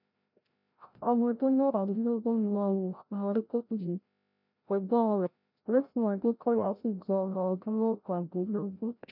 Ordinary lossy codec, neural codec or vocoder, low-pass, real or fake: none; codec, 16 kHz, 0.5 kbps, FreqCodec, larger model; 5.4 kHz; fake